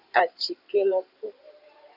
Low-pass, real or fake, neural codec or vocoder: 5.4 kHz; fake; codec, 16 kHz in and 24 kHz out, 2.2 kbps, FireRedTTS-2 codec